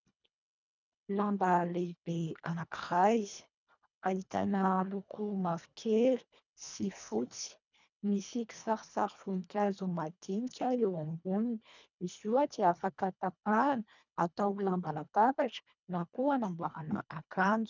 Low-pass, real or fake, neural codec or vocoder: 7.2 kHz; fake; codec, 24 kHz, 1.5 kbps, HILCodec